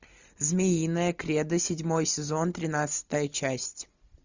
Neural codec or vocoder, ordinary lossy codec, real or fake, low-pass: none; Opus, 64 kbps; real; 7.2 kHz